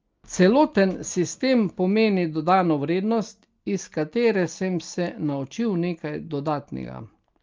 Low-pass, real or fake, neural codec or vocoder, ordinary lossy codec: 7.2 kHz; real; none; Opus, 24 kbps